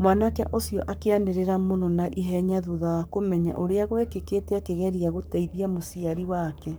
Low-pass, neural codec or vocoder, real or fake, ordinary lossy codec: none; codec, 44.1 kHz, 7.8 kbps, Pupu-Codec; fake; none